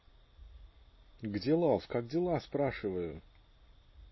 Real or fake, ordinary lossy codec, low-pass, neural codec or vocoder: real; MP3, 24 kbps; 7.2 kHz; none